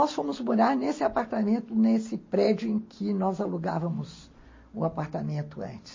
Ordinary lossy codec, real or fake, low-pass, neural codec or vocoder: MP3, 32 kbps; real; 7.2 kHz; none